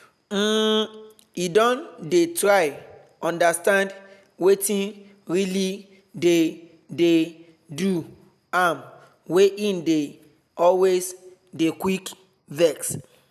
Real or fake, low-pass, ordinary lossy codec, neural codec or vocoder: real; 14.4 kHz; none; none